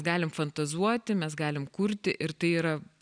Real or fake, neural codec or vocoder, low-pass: real; none; 9.9 kHz